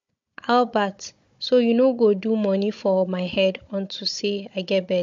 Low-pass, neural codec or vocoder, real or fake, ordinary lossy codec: 7.2 kHz; codec, 16 kHz, 16 kbps, FunCodec, trained on Chinese and English, 50 frames a second; fake; MP3, 48 kbps